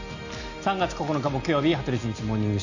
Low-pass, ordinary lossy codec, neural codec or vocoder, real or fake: 7.2 kHz; none; none; real